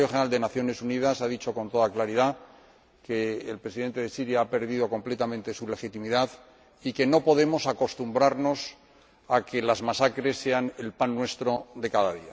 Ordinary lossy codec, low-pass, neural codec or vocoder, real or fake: none; none; none; real